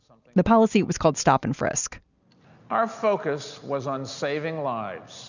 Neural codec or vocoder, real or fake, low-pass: none; real; 7.2 kHz